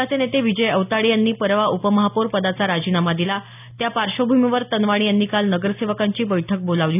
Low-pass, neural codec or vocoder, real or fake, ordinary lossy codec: 3.6 kHz; none; real; none